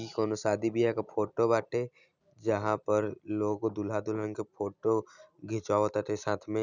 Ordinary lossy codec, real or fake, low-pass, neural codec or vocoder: none; real; 7.2 kHz; none